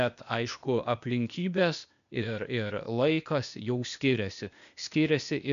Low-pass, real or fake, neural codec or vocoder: 7.2 kHz; fake; codec, 16 kHz, 0.8 kbps, ZipCodec